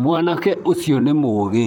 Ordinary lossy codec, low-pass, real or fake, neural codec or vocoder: none; 19.8 kHz; fake; vocoder, 44.1 kHz, 128 mel bands, Pupu-Vocoder